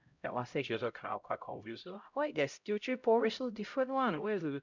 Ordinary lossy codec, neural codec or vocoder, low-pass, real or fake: none; codec, 16 kHz, 0.5 kbps, X-Codec, HuBERT features, trained on LibriSpeech; 7.2 kHz; fake